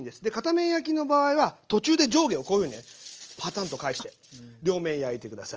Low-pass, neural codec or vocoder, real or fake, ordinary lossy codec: 7.2 kHz; none; real; Opus, 24 kbps